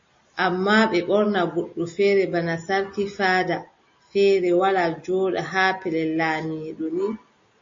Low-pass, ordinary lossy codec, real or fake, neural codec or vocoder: 7.2 kHz; MP3, 32 kbps; real; none